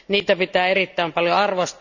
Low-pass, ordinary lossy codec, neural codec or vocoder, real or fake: 7.2 kHz; none; none; real